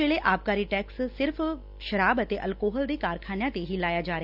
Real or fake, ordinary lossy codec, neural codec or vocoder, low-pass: real; none; none; 5.4 kHz